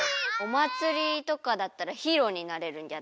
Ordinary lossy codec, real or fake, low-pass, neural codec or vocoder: none; real; none; none